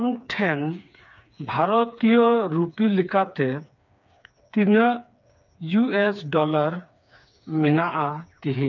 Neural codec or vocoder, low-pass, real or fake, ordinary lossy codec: codec, 16 kHz, 4 kbps, FreqCodec, smaller model; 7.2 kHz; fake; none